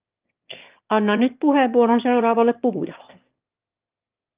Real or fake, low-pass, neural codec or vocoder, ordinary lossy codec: fake; 3.6 kHz; autoencoder, 22.05 kHz, a latent of 192 numbers a frame, VITS, trained on one speaker; Opus, 32 kbps